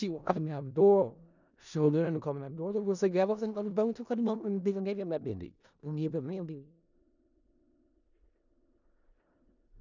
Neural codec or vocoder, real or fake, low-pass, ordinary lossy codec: codec, 16 kHz in and 24 kHz out, 0.4 kbps, LongCat-Audio-Codec, four codebook decoder; fake; 7.2 kHz; none